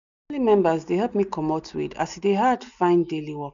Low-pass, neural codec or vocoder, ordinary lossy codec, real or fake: 7.2 kHz; none; none; real